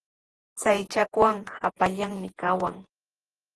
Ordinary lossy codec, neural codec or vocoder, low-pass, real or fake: Opus, 16 kbps; vocoder, 48 kHz, 128 mel bands, Vocos; 10.8 kHz; fake